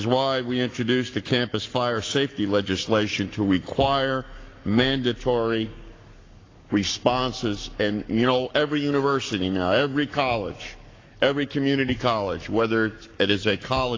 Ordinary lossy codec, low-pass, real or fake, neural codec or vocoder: AAC, 32 kbps; 7.2 kHz; fake; codec, 44.1 kHz, 7.8 kbps, Pupu-Codec